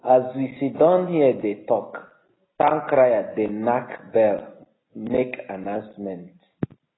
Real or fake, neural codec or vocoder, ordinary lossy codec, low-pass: real; none; AAC, 16 kbps; 7.2 kHz